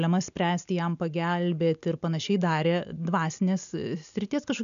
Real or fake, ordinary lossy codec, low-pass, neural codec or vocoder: real; MP3, 96 kbps; 7.2 kHz; none